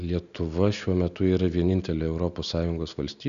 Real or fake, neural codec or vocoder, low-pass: real; none; 7.2 kHz